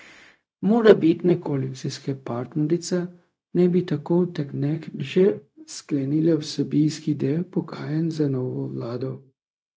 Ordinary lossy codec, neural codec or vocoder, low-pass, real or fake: none; codec, 16 kHz, 0.4 kbps, LongCat-Audio-Codec; none; fake